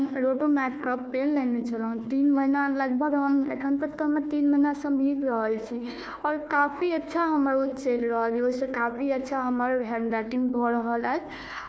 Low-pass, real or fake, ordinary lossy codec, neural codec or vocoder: none; fake; none; codec, 16 kHz, 1 kbps, FunCodec, trained on Chinese and English, 50 frames a second